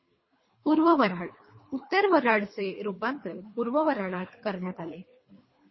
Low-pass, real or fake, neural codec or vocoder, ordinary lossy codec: 7.2 kHz; fake; codec, 24 kHz, 3 kbps, HILCodec; MP3, 24 kbps